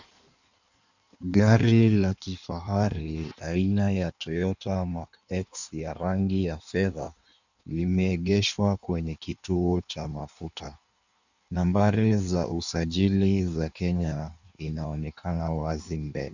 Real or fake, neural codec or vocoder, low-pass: fake; codec, 16 kHz in and 24 kHz out, 1.1 kbps, FireRedTTS-2 codec; 7.2 kHz